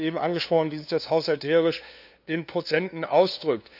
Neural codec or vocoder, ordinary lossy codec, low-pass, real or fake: codec, 16 kHz, 2 kbps, FunCodec, trained on LibriTTS, 25 frames a second; none; 5.4 kHz; fake